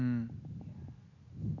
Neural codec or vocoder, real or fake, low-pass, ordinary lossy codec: none; real; 7.2 kHz; none